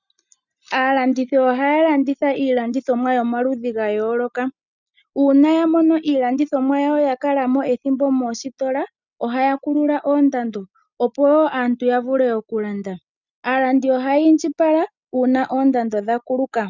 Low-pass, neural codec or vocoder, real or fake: 7.2 kHz; none; real